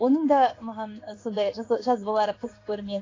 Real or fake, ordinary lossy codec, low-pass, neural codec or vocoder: fake; AAC, 32 kbps; 7.2 kHz; codec, 16 kHz in and 24 kHz out, 1 kbps, XY-Tokenizer